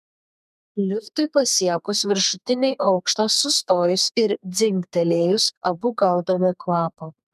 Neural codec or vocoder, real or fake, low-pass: codec, 32 kHz, 1.9 kbps, SNAC; fake; 14.4 kHz